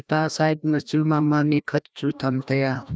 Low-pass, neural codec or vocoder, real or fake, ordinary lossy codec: none; codec, 16 kHz, 1 kbps, FreqCodec, larger model; fake; none